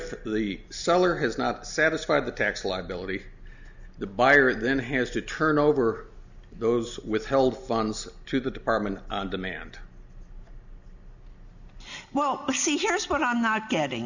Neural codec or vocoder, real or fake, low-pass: none; real; 7.2 kHz